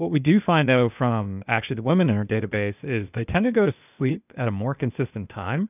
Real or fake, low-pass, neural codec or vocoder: fake; 3.6 kHz; codec, 16 kHz, 0.8 kbps, ZipCodec